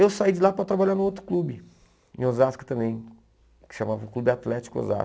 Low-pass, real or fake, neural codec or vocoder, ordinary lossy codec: none; real; none; none